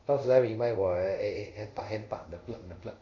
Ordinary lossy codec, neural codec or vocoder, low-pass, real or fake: AAC, 48 kbps; codec, 24 kHz, 0.5 kbps, DualCodec; 7.2 kHz; fake